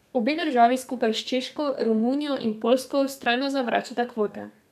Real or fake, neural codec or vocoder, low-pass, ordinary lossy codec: fake; codec, 32 kHz, 1.9 kbps, SNAC; 14.4 kHz; none